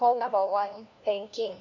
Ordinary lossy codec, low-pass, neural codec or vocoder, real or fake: none; 7.2 kHz; codec, 16 kHz, 1 kbps, FunCodec, trained on LibriTTS, 50 frames a second; fake